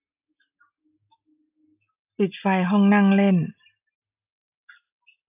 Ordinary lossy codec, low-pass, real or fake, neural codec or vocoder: AAC, 32 kbps; 3.6 kHz; real; none